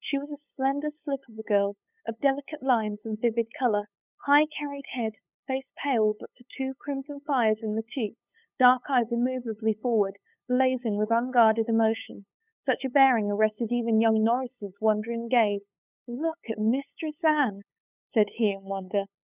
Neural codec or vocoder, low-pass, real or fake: codec, 16 kHz, 8 kbps, FunCodec, trained on LibriTTS, 25 frames a second; 3.6 kHz; fake